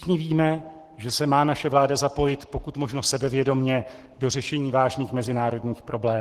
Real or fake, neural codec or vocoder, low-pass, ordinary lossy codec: fake; codec, 44.1 kHz, 7.8 kbps, Pupu-Codec; 14.4 kHz; Opus, 16 kbps